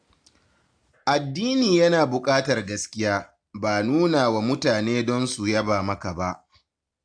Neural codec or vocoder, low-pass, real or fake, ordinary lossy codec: none; 9.9 kHz; real; AAC, 64 kbps